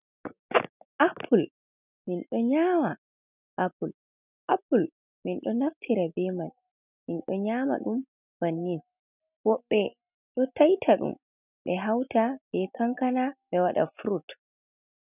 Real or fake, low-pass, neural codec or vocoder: real; 3.6 kHz; none